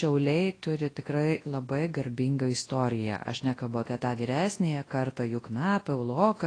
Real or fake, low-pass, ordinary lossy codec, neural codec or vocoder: fake; 9.9 kHz; AAC, 32 kbps; codec, 24 kHz, 0.9 kbps, WavTokenizer, large speech release